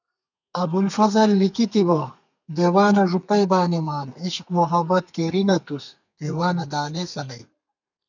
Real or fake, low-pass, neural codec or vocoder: fake; 7.2 kHz; codec, 32 kHz, 1.9 kbps, SNAC